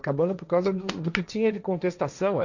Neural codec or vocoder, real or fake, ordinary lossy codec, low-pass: codec, 16 kHz, 1.1 kbps, Voila-Tokenizer; fake; none; 7.2 kHz